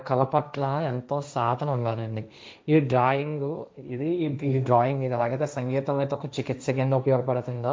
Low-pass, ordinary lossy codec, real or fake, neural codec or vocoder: none; none; fake; codec, 16 kHz, 1.1 kbps, Voila-Tokenizer